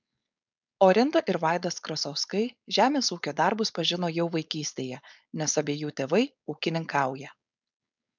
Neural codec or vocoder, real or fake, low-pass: codec, 16 kHz, 4.8 kbps, FACodec; fake; 7.2 kHz